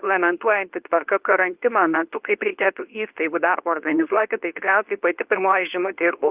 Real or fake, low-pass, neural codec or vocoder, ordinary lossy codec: fake; 3.6 kHz; codec, 24 kHz, 0.9 kbps, WavTokenizer, medium speech release version 2; Opus, 32 kbps